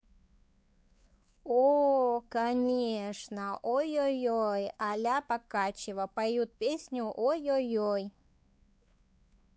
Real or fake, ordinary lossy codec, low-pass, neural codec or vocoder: fake; none; none; codec, 16 kHz, 4 kbps, X-Codec, WavLM features, trained on Multilingual LibriSpeech